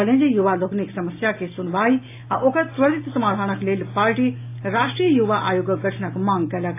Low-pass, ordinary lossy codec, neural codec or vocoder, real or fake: 3.6 kHz; AAC, 24 kbps; none; real